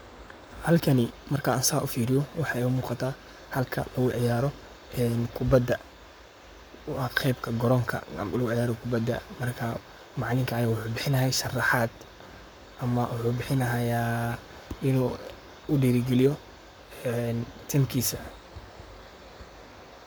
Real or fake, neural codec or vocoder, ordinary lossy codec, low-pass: fake; codec, 44.1 kHz, 7.8 kbps, Pupu-Codec; none; none